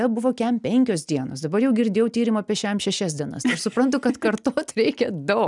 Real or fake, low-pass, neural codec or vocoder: real; 10.8 kHz; none